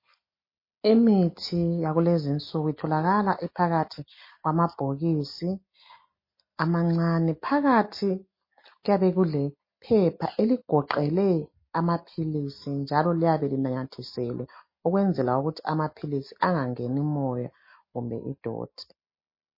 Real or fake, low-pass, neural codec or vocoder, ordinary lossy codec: real; 5.4 kHz; none; MP3, 24 kbps